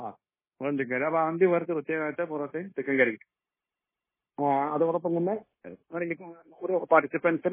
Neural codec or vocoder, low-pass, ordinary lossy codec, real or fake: codec, 16 kHz, 0.9 kbps, LongCat-Audio-Codec; 3.6 kHz; MP3, 16 kbps; fake